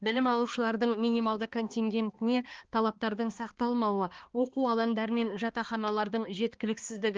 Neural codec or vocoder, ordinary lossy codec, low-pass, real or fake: codec, 16 kHz, 2 kbps, X-Codec, HuBERT features, trained on balanced general audio; Opus, 16 kbps; 7.2 kHz; fake